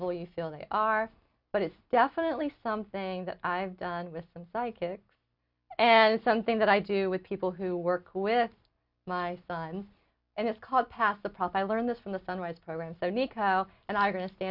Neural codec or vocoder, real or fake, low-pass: none; real; 5.4 kHz